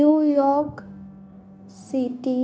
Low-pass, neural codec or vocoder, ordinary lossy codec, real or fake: none; codec, 16 kHz, 0.9 kbps, LongCat-Audio-Codec; none; fake